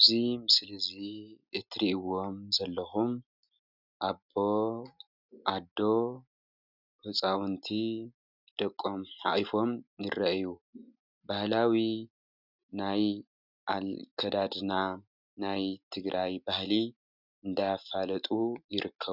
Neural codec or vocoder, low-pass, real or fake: none; 5.4 kHz; real